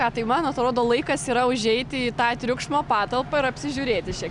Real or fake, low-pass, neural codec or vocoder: real; 10.8 kHz; none